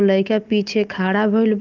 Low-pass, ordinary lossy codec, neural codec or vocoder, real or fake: 7.2 kHz; Opus, 24 kbps; none; real